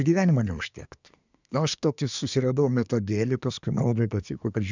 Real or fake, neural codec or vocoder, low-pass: fake; codec, 24 kHz, 1 kbps, SNAC; 7.2 kHz